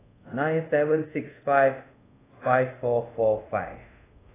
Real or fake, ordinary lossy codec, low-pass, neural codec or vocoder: fake; AAC, 16 kbps; 3.6 kHz; codec, 24 kHz, 0.5 kbps, DualCodec